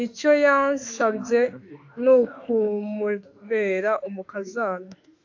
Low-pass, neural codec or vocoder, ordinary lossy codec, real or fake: 7.2 kHz; autoencoder, 48 kHz, 32 numbers a frame, DAC-VAE, trained on Japanese speech; AAC, 48 kbps; fake